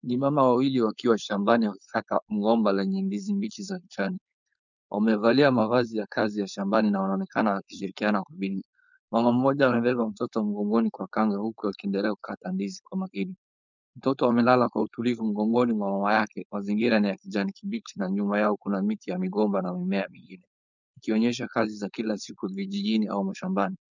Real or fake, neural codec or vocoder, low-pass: fake; codec, 16 kHz, 4.8 kbps, FACodec; 7.2 kHz